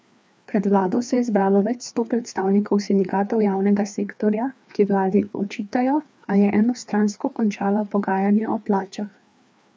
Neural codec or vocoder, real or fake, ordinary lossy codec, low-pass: codec, 16 kHz, 2 kbps, FreqCodec, larger model; fake; none; none